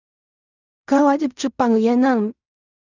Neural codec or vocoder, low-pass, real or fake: codec, 16 kHz in and 24 kHz out, 0.4 kbps, LongCat-Audio-Codec, fine tuned four codebook decoder; 7.2 kHz; fake